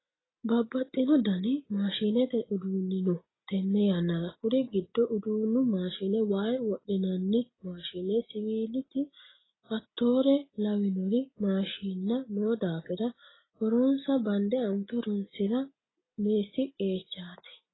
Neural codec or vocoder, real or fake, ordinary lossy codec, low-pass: none; real; AAC, 16 kbps; 7.2 kHz